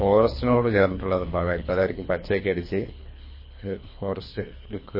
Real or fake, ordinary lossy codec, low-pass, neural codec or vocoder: fake; MP3, 24 kbps; 5.4 kHz; codec, 24 kHz, 3 kbps, HILCodec